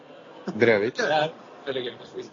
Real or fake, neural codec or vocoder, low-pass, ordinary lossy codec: real; none; 7.2 kHz; AAC, 32 kbps